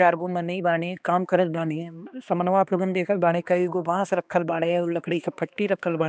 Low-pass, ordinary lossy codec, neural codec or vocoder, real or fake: none; none; codec, 16 kHz, 2 kbps, X-Codec, HuBERT features, trained on balanced general audio; fake